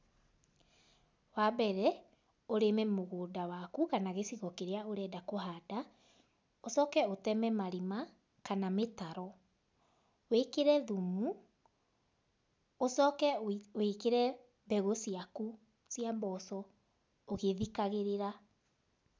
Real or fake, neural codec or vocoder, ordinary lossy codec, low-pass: real; none; none; none